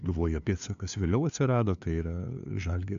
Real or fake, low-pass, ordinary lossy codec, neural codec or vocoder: fake; 7.2 kHz; MP3, 64 kbps; codec, 16 kHz, 2 kbps, FunCodec, trained on LibriTTS, 25 frames a second